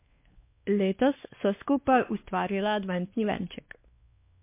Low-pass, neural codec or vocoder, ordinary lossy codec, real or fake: 3.6 kHz; codec, 16 kHz, 2 kbps, X-Codec, WavLM features, trained on Multilingual LibriSpeech; MP3, 24 kbps; fake